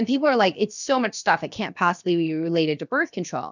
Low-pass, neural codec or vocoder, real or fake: 7.2 kHz; codec, 16 kHz, about 1 kbps, DyCAST, with the encoder's durations; fake